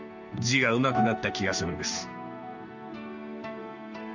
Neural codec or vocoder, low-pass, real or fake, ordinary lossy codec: codec, 16 kHz in and 24 kHz out, 1 kbps, XY-Tokenizer; 7.2 kHz; fake; none